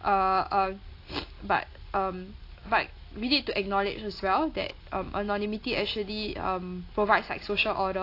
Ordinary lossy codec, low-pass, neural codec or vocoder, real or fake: AAC, 32 kbps; 5.4 kHz; none; real